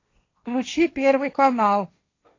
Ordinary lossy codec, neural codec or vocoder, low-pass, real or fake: AAC, 32 kbps; codec, 16 kHz, 1.1 kbps, Voila-Tokenizer; 7.2 kHz; fake